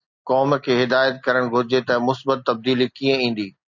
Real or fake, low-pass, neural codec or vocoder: real; 7.2 kHz; none